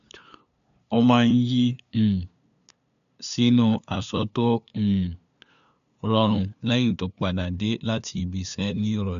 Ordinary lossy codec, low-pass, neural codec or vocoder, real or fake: none; 7.2 kHz; codec, 16 kHz, 2 kbps, FunCodec, trained on LibriTTS, 25 frames a second; fake